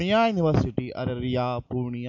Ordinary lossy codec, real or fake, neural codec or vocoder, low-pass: MP3, 48 kbps; real; none; 7.2 kHz